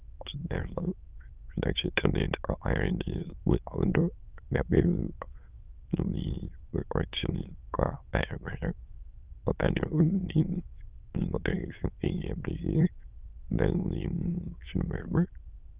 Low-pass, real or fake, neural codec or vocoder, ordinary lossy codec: 3.6 kHz; fake; autoencoder, 22.05 kHz, a latent of 192 numbers a frame, VITS, trained on many speakers; Opus, 24 kbps